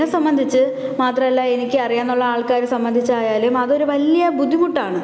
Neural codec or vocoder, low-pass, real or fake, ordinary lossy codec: none; none; real; none